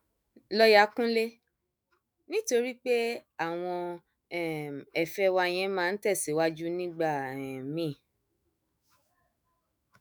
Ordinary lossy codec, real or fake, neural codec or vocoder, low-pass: none; fake; autoencoder, 48 kHz, 128 numbers a frame, DAC-VAE, trained on Japanese speech; none